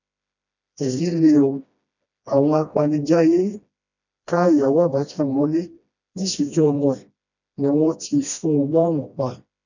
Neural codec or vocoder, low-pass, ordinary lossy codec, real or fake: codec, 16 kHz, 1 kbps, FreqCodec, smaller model; 7.2 kHz; none; fake